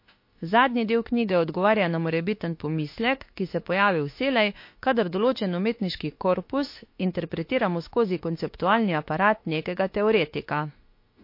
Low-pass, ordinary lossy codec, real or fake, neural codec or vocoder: 5.4 kHz; MP3, 32 kbps; fake; autoencoder, 48 kHz, 32 numbers a frame, DAC-VAE, trained on Japanese speech